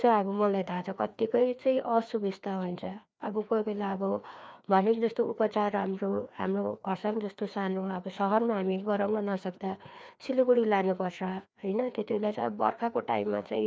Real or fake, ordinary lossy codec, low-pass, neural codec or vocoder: fake; none; none; codec, 16 kHz, 2 kbps, FreqCodec, larger model